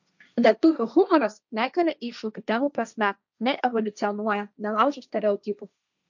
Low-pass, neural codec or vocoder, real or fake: 7.2 kHz; codec, 16 kHz, 1.1 kbps, Voila-Tokenizer; fake